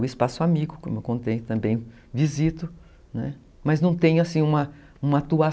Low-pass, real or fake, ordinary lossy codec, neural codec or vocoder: none; real; none; none